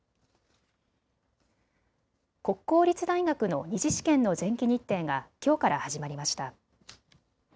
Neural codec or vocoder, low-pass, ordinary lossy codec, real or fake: none; none; none; real